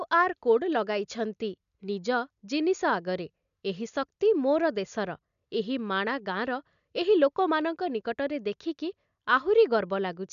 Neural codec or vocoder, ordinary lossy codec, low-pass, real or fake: none; MP3, 96 kbps; 7.2 kHz; real